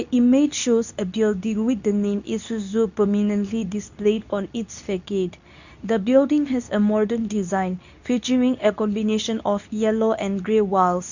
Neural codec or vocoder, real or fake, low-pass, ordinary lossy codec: codec, 24 kHz, 0.9 kbps, WavTokenizer, medium speech release version 2; fake; 7.2 kHz; none